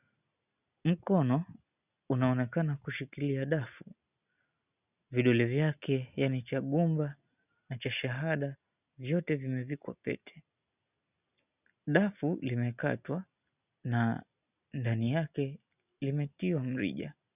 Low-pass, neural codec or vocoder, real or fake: 3.6 kHz; none; real